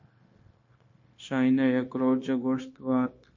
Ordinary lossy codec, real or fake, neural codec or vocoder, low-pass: MP3, 32 kbps; fake; codec, 16 kHz, 0.9 kbps, LongCat-Audio-Codec; 7.2 kHz